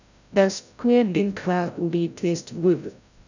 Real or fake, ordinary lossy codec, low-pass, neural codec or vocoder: fake; none; 7.2 kHz; codec, 16 kHz, 0.5 kbps, FreqCodec, larger model